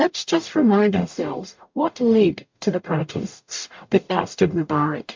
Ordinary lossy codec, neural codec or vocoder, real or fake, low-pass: MP3, 48 kbps; codec, 44.1 kHz, 0.9 kbps, DAC; fake; 7.2 kHz